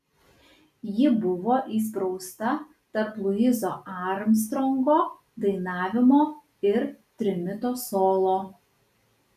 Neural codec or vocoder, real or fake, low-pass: none; real; 14.4 kHz